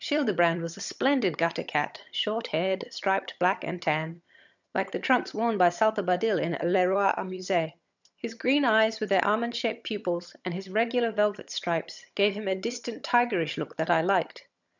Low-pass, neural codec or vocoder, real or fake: 7.2 kHz; vocoder, 22.05 kHz, 80 mel bands, HiFi-GAN; fake